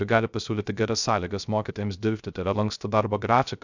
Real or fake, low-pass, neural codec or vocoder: fake; 7.2 kHz; codec, 16 kHz, 0.3 kbps, FocalCodec